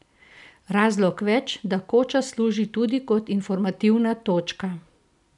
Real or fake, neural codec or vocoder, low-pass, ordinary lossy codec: real; none; 10.8 kHz; none